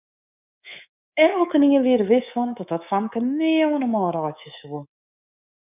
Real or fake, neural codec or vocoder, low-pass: fake; codec, 24 kHz, 3.1 kbps, DualCodec; 3.6 kHz